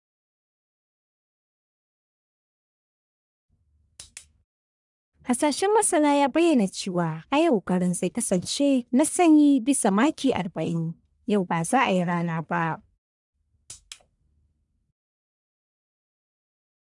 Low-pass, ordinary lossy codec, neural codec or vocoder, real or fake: 10.8 kHz; none; codec, 44.1 kHz, 1.7 kbps, Pupu-Codec; fake